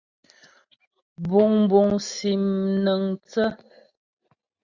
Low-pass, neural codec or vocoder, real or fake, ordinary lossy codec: 7.2 kHz; none; real; Opus, 64 kbps